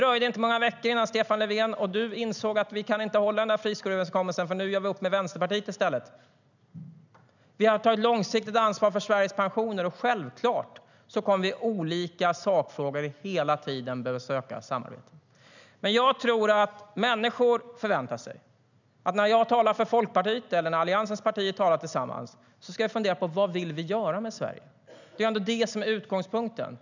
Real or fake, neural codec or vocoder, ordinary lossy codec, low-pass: real; none; none; 7.2 kHz